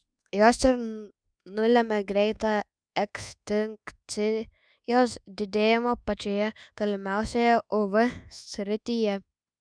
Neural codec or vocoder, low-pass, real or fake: codec, 24 kHz, 1.2 kbps, DualCodec; 9.9 kHz; fake